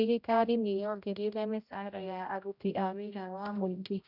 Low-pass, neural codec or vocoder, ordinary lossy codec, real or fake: 5.4 kHz; codec, 16 kHz, 0.5 kbps, X-Codec, HuBERT features, trained on general audio; none; fake